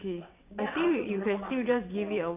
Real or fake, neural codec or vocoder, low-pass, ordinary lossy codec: fake; vocoder, 22.05 kHz, 80 mel bands, WaveNeXt; 3.6 kHz; none